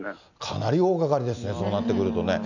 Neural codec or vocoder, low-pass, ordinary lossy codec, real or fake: none; 7.2 kHz; none; real